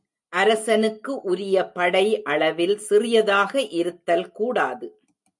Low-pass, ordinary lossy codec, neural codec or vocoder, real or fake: 10.8 kHz; MP3, 64 kbps; none; real